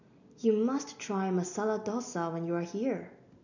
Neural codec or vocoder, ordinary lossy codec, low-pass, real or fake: none; none; 7.2 kHz; real